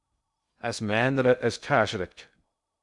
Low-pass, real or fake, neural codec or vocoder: 10.8 kHz; fake; codec, 16 kHz in and 24 kHz out, 0.6 kbps, FocalCodec, streaming, 2048 codes